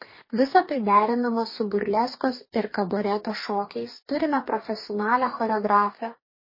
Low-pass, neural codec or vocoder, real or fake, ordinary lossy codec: 5.4 kHz; codec, 44.1 kHz, 2.6 kbps, DAC; fake; MP3, 24 kbps